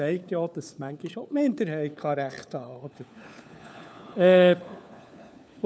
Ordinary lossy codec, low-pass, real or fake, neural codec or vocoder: none; none; fake; codec, 16 kHz, 4 kbps, FunCodec, trained on LibriTTS, 50 frames a second